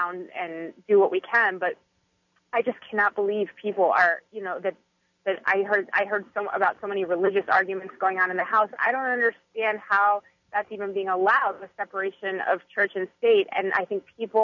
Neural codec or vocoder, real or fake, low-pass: none; real; 7.2 kHz